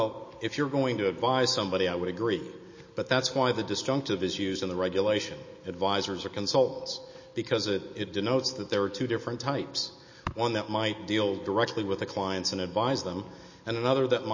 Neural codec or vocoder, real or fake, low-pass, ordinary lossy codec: none; real; 7.2 kHz; MP3, 32 kbps